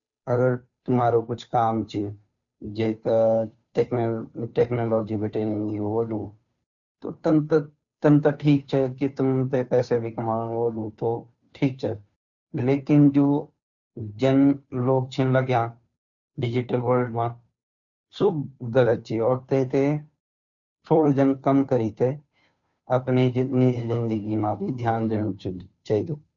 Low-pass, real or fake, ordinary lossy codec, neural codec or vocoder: 7.2 kHz; fake; none; codec, 16 kHz, 2 kbps, FunCodec, trained on Chinese and English, 25 frames a second